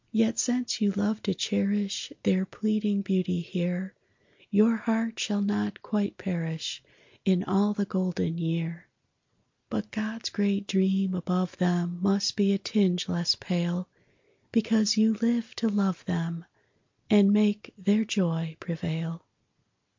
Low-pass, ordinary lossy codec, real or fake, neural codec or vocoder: 7.2 kHz; MP3, 48 kbps; real; none